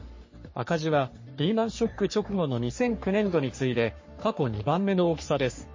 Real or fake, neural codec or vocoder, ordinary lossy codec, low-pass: fake; codec, 44.1 kHz, 3.4 kbps, Pupu-Codec; MP3, 32 kbps; 7.2 kHz